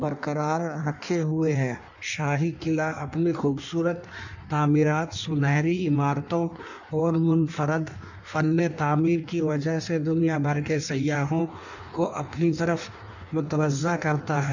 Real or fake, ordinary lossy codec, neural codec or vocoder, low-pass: fake; none; codec, 16 kHz in and 24 kHz out, 1.1 kbps, FireRedTTS-2 codec; 7.2 kHz